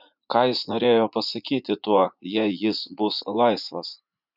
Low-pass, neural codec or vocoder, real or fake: 5.4 kHz; vocoder, 44.1 kHz, 80 mel bands, Vocos; fake